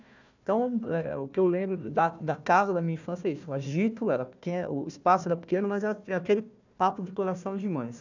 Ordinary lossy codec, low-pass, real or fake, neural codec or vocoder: none; 7.2 kHz; fake; codec, 16 kHz, 1 kbps, FunCodec, trained on Chinese and English, 50 frames a second